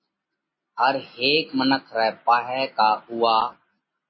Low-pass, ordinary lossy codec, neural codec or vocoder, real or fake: 7.2 kHz; MP3, 24 kbps; none; real